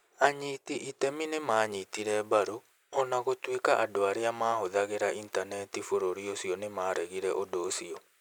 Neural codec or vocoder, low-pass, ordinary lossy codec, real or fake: none; none; none; real